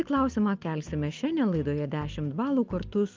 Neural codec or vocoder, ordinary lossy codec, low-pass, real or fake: none; Opus, 32 kbps; 7.2 kHz; real